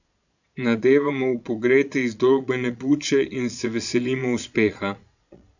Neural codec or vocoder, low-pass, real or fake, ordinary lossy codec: vocoder, 22.05 kHz, 80 mel bands, Vocos; 7.2 kHz; fake; none